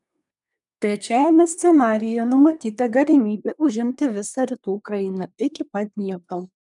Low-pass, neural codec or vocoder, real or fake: 10.8 kHz; codec, 24 kHz, 1 kbps, SNAC; fake